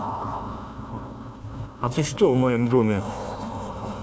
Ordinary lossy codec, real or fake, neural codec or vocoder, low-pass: none; fake; codec, 16 kHz, 1 kbps, FunCodec, trained on Chinese and English, 50 frames a second; none